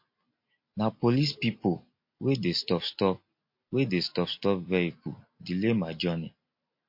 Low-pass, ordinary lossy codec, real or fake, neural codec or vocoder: 5.4 kHz; MP3, 32 kbps; real; none